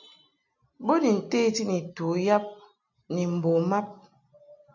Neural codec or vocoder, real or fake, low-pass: none; real; 7.2 kHz